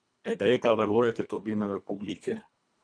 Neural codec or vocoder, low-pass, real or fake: codec, 24 kHz, 1.5 kbps, HILCodec; 9.9 kHz; fake